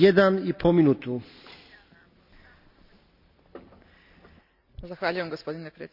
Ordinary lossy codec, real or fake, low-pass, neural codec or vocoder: none; real; 5.4 kHz; none